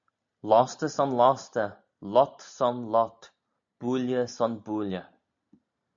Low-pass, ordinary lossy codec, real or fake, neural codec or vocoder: 7.2 kHz; AAC, 64 kbps; real; none